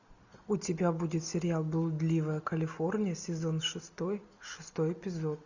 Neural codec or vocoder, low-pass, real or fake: none; 7.2 kHz; real